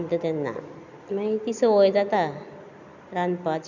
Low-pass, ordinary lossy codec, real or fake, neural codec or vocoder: 7.2 kHz; none; real; none